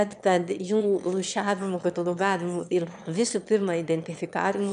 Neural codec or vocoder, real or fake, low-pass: autoencoder, 22.05 kHz, a latent of 192 numbers a frame, VITS, trained on one speaker; fake; 9.9 kHz